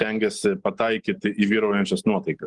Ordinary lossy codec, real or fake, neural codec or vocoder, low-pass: Opus, 24 kbps; real; none; 10.8 kHz